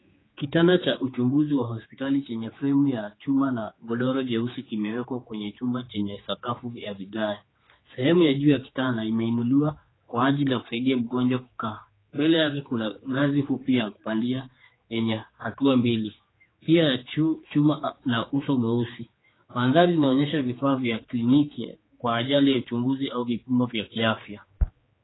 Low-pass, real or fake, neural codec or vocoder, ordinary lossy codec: 7.2 kHz; fake; codec, 16 kHz, 4 kbps, X-Codec, HuBERT features, trained on general audio; AAC, 16 kbps